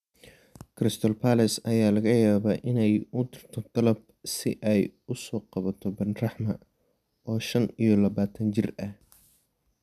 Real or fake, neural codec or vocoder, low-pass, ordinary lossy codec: real; none; 14.4 kHz; none